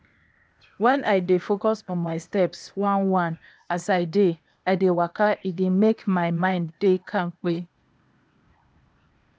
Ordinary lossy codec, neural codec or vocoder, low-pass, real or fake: none; codec, 16 kHz, 0.8 kbps, ZipCodec; none; fake